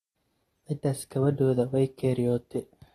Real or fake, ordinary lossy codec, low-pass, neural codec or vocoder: real; AAC, 32 kbps; 19.8 kHz; none